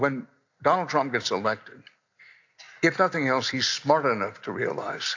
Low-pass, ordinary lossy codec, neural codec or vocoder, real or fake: 7.2 kHz; AAC, 48 kbps; none; real